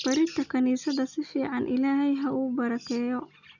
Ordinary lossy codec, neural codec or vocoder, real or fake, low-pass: none; none; real; 7.2 kHz